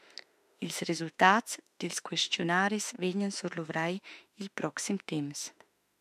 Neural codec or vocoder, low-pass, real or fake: autoencoder, 48 kHz, 32 numbers a frame, DAC-VAE, trained on Japanese speech; 14.4 kHz; fake